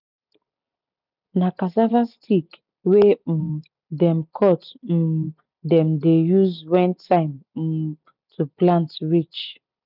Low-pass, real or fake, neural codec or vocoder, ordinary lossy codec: 5.4 kHz; real; none; none